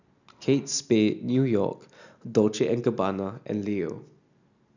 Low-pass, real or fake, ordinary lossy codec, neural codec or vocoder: 7.2 kHz; real; none; none